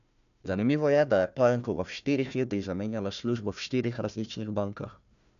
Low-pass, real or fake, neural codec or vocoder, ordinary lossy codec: 7.2 kHz; fake; codec, 16 kHz, 1 kbps, FunCodec, trained on Chinese and English, 50 frames a second; none